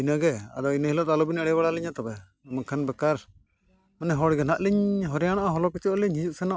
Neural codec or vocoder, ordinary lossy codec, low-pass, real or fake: none; none; none; real